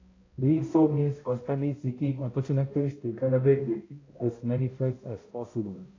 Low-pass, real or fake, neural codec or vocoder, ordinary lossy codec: 7.2 kHz; fake; codec, 16 kHz, 0.5 kbps, X-Codec, HuBERT features, trained on balanced general audio; AAC, 32 kbps